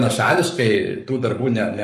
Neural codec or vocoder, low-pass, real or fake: codec, 44.1 kHz, 7.8 kbps, Pupu-Codec; 14.4 kHz; fake